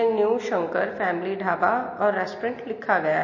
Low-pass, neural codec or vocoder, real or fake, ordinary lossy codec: 7.2 kHz; none; real; MP3, 32 kbps